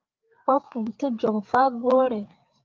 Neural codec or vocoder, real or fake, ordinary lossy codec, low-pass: codec, 16 kHz, 4 kbps, FreqCodec, larger model; fake; Opus, 24 kbps; 7.2 kHz